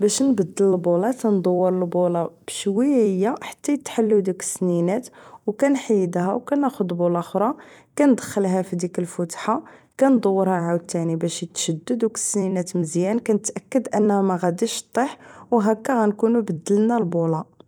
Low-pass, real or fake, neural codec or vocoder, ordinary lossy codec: 19.8 kHz; fake; vocoder, 44.1 kHz, 128 mel bands every 256 samples, BigVGAN v2; none